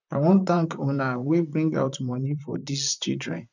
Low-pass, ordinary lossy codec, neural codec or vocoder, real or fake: 7.2 kHz; none; vocoder, 44.1 kHz, 128 mel bands, Pupu-Vocoder; fake